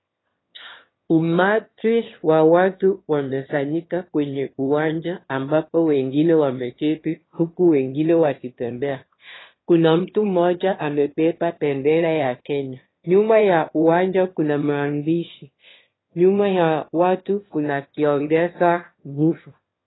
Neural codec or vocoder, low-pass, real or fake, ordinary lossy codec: autoencoder, 22.05 kHz, a latent of 192 numbers a frame, VITS, trained on one speaker; 7.2 kHz; fake; AAC, 16 kbps